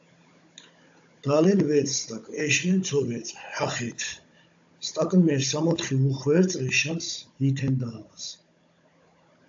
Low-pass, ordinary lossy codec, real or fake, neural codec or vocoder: 7.2 kHz; AAC, 64 kbps; fake; codec, 16 kHz, 16 kbps, FunCodec, trained on Chinese and English, 50 frames a second